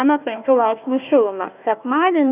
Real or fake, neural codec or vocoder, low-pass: fake; codec, 16 kHz, 1 kbps, FunCodec, trained on Chinese and English, 50 frames a second; 3.6 kHz